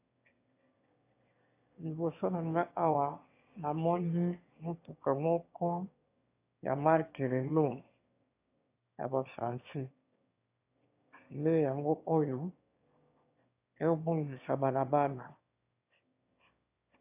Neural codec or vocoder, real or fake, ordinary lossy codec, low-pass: autoencoder, 22.05 kHz, a latent of 192 numbers a frame, VITS, trained on one speaker; fake; AAC, 32 kbps; 3.6 kHz